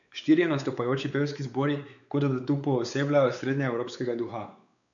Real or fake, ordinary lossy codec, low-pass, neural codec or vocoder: fake; none; 7.2 kHz; codec, 16 kHz, 4 kbps, X-Codec, WavLM features, trained on Multilingual LibriSpeech